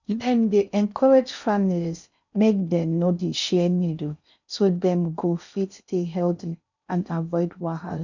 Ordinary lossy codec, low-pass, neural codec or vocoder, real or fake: none; 7.2 kHz; codec, 16 kHz in and 24 kHz out, 0.6 kbps, FocalCodec, streaming, 4096 codes; fake